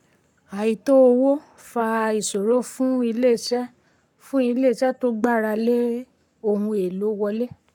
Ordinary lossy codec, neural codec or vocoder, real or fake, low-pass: none; codec, 44.1 kHz, 7.8 kbps, Pupu-Codec; fake; 19.8 kHz